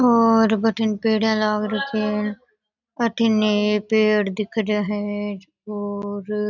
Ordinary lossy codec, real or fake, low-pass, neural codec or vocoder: none; real; 7.2 kHz; none